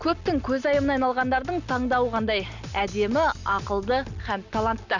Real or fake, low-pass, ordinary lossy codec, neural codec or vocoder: real; 7.2 kHz; none; none